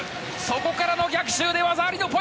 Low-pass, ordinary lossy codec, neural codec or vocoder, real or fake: none; none; none; real